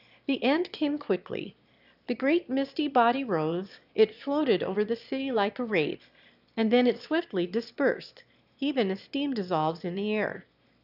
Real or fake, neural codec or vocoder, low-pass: fake; autoencoder, 22.05 kHz, a latent of 192 numbers a frame, VITS, trained on one speaker; 5.4 kHz